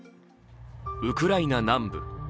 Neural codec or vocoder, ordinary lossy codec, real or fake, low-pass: none; none; real; none